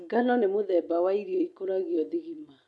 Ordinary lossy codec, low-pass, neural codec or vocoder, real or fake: none; none; none; real